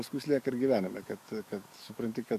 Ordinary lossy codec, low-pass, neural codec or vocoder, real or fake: MP3, 96 kbps; 14.4 kHz; codec, 44.1 kHz, 7.8 kbps, DAC; fake